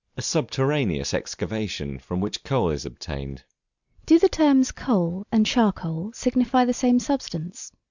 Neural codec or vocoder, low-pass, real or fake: none; 7.2 kHz; real